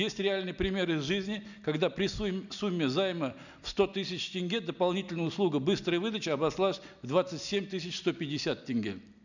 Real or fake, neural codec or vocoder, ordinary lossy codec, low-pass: real; none; none; 7.2 kHz